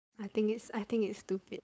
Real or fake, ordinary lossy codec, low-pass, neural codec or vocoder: fake; none; none; codec, 16 kHz, 4.8 kbps, FACodec